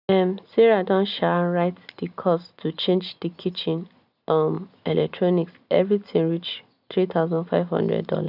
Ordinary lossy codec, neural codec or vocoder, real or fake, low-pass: none; none; real; 5.4 kHz